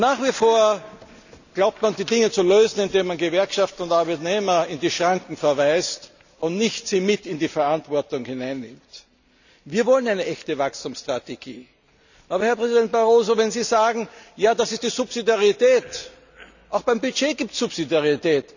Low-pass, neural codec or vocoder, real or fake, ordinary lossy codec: 7.2 kHz; none; real; none